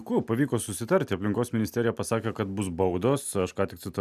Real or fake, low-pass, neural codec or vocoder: real; 14.4 kHz; none